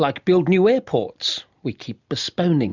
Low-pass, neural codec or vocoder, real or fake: 7.2 kHz; none; real